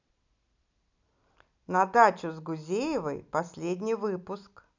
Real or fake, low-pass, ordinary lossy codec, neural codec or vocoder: real; 7.2 kHz; none; none